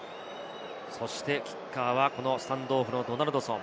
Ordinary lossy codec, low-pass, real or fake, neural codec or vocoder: none; none; real; none